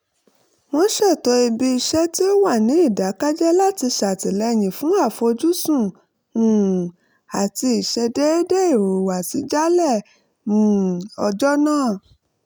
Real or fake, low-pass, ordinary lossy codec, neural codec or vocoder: real; none; none; none